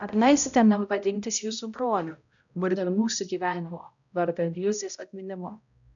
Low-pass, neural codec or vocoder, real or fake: 7.2 kHz; codec, 16 kHz, 0.5 kbps, X-Codec, HuBERT features, trained on balanced general audio; fake